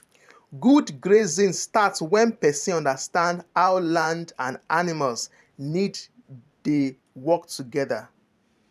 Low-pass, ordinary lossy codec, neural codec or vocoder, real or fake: 14.4 kHz; none; vocoder, 44.1 kHz, 128 mel bands every 512 samples, BigVGAN v2; fake